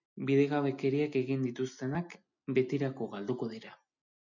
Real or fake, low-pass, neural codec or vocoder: real; 7.2 kHz; none